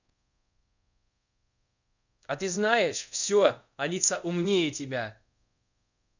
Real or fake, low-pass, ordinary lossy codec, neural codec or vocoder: fake; 7.2 kHz; none; codec, 24 kHz, 0.5 kbps, DualCodec